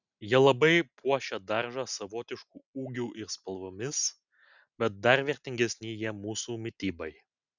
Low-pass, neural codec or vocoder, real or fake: 7.2 kHz; none; real